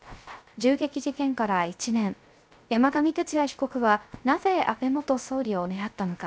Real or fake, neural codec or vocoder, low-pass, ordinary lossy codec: fake; codec, 16 kHz, 0.3 kbps, FocalCodec; none; none